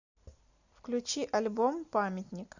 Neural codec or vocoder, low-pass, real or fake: none; 7.2 kHz; real